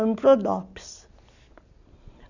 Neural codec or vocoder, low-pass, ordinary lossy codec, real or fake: none; 7.2 kHz; none; real